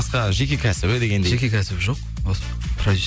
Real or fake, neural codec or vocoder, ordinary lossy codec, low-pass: real; none; none; none